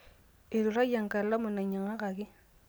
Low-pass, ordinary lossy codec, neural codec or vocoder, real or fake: none; none; none; real